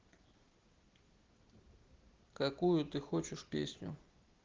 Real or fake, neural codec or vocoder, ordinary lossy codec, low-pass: real; none; Opus, 16 kbps; 7.2 kHz